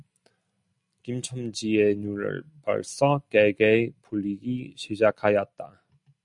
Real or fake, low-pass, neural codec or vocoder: real; 10.8 kHz; none